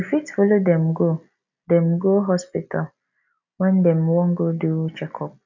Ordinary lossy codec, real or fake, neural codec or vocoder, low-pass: none; real; none; 7.2 kHz